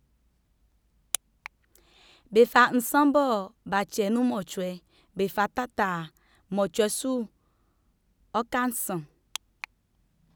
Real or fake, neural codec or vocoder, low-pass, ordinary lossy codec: real; none; none; none